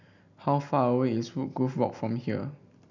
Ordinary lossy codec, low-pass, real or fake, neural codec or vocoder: none; 7.2 kHz; real; none